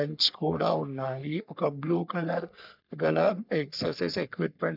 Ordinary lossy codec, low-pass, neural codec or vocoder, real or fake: none; 5.4 kHz; codec, 44.1 kHz, 3.4 kbps, Pupu-Codec; fake